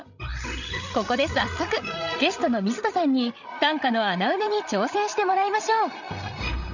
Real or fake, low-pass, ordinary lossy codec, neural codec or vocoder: fake; 7.2 kHz; none; codec, 16 kHz, 8 kbps, FreqCodec, larger model